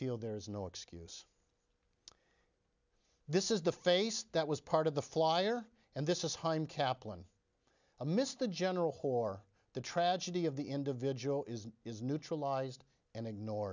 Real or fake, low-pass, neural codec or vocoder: real; 7.2 kHz; none